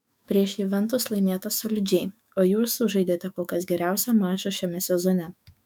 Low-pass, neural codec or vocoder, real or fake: 19.8 kHz; autoencoder, 48 kHz, 128 numbers a frame, DAC-VAE, trained on Japanese speech; fake